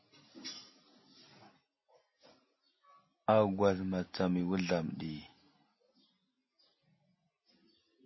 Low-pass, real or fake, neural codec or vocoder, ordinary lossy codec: 7.2 kHz; real; none; MP3, 24 kbps